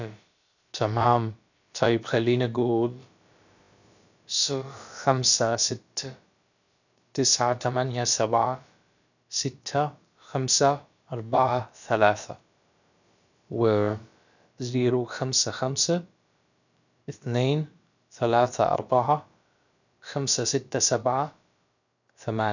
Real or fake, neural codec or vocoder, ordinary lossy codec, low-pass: fake; codec, 16 kHz, about 1 kbps, DyCAST, with the encoder's durations; none; 7.2 kHz